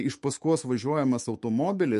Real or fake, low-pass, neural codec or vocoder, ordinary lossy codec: fake; 14.4 kHz; autoencoder, 48 kHz, 128 numbers a frame, DAC-VAE, trained on Japanese speech; MP3, 48 kbps